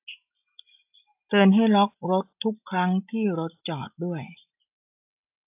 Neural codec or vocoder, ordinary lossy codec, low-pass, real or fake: none; AAC, 32 kbps; 3.6 kHz; real